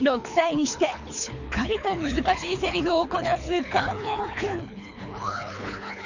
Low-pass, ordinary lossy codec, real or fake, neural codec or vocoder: 7.2 kHz; none; fake; codec, 24 kHz, 3 kbps, HILCodec